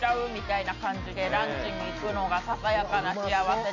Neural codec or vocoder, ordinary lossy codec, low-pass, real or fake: none; none; 7.2 kHz; real